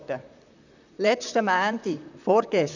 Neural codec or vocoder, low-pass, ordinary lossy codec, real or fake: vocoder, 44.1 kHz, 128 mel bands, Pupu-Vocoder; 7.2 kHz; none; fake